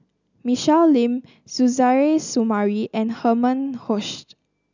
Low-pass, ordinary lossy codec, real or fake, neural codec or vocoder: 7.2 kHz; none; real; none